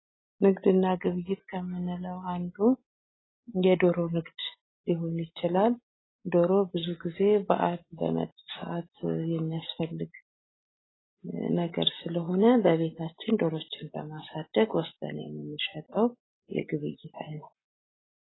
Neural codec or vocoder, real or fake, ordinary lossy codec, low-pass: none; real; AAC, 16 kbps; 7.2 kHz